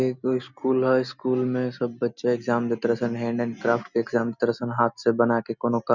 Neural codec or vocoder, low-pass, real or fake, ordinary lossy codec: none; 7.2 kHz; real; none